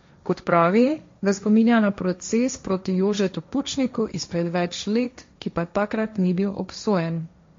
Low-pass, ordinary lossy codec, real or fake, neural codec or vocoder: 7.2 kHz; MP3, 48 kbps; fake; codec, 16 kHz, 1.1 kbps, Voila-Tokenizer